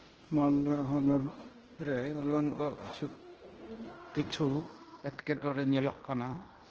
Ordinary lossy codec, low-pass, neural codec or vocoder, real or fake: Opus, 16 kbps; 7.2 kHz; codec, 16 kHz in and 24 kHz out, 0.9 kbps, LongCat-Audio-Codec, fine tuned four codebook decoder; fake